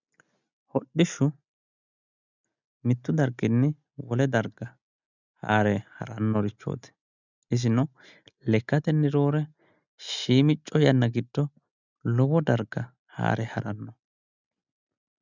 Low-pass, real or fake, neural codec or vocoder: 7.2 kHz; real; none